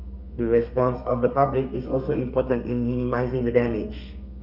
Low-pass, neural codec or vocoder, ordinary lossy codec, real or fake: 5.4 kHz; codec, 32 kHz, 1.9 kbps, SNAC; none; fake